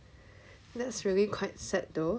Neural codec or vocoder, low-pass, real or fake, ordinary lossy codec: none; none; real; none